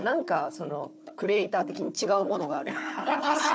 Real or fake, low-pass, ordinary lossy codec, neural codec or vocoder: fake; none; none; codec, 16 kHz, 4 kbps, FunCodec, trained on Chinese and English, 50 frames a second